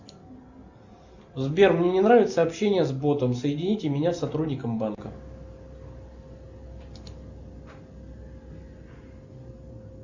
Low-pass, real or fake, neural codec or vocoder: 7.2 kHz; real; none